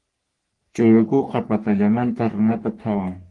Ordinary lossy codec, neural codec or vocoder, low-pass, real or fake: Opus, 24 kbps; codec, 44.1 kHz, 3.4 kbps, Pupu-Codec; 10.8 kHz; fake